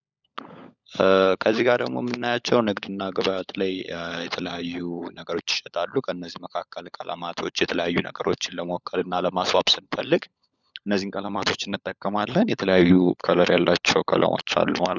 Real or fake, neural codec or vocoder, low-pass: fake; codec, 16 kHz, 16 kbps, FunCodec, trained on LibriTTS, 50 frames a second; 7.2 kHz